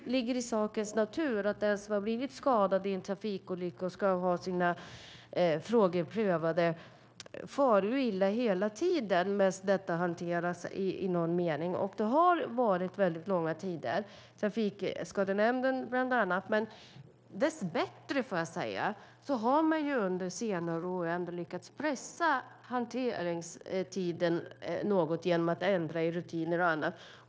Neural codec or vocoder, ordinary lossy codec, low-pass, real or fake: codec, 16 kHz, 0.9 kbps, LongCat-Audio-Codec; none; none; fake